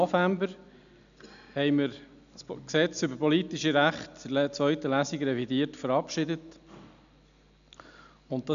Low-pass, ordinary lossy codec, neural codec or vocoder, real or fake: 7.2 kHz; none; none; real